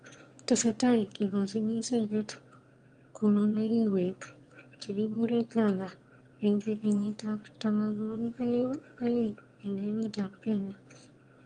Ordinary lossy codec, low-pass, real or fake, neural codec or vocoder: Opus, 32 kbps; 9.9 kHz; fake; autoencoder, 22.05 kHz, a latent of 192 numbers a frame, VITS, trained on one speaker